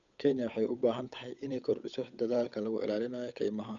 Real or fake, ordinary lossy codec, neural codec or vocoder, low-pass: fake; none; codec, 16 kHz, 8 kbps, FunCodec, trained on Chinese and English, 25 frames a second; 7.2 kHz